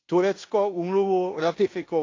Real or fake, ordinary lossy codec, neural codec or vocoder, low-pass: fake; AAC, 32 kbps; codec, 16 kHz in and 24 kHz out, 0.9 kbps, LongCat-Audio-Codec, fine tuned four codebook decoder; 7.2 kHz